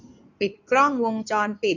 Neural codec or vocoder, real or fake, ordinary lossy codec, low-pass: codec, 16 kHz in and 24 kHz out, 2.2 kbps, FireRedTTS-2 codec; fake; none; 7.2 kHz